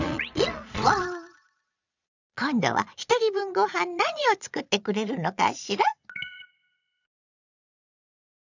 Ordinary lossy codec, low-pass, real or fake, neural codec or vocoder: none; 7.2 kHz; fake; vocoder, 22.05 kHz, 80 mel bands, Vocos